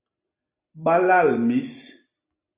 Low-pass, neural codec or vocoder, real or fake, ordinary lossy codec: 3.6 kHz; none; real; Opus, 64 kbps